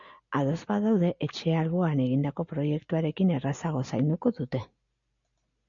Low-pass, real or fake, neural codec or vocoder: 7.2 kHz; real; none